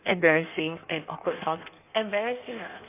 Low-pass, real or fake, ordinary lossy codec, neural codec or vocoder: 3.6 kHz; fake; none; codec, 16 kHz in and 24 kHz out, 1.1 kbps, FireRedTTS-2 codec